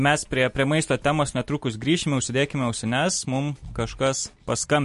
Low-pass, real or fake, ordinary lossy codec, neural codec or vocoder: 14.4 kHz; real; MP3, 48 kbps; none